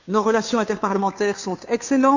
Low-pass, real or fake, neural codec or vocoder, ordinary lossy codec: 7.2 kHz; fake; codec, 16 kHz, 2 kbps, FunCodec, trained on Chinese and English, 25 frames a second; none